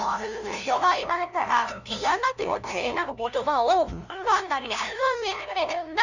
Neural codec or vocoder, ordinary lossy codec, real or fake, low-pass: codec, 16 kHz, 0.5 kbps, FunCodec, trained on LibriTTS, 25 frames a second; none; fake; 7.2 kHz